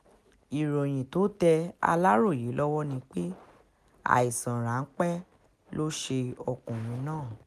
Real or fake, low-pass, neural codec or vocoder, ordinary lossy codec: real; 14.4 kHz; none; none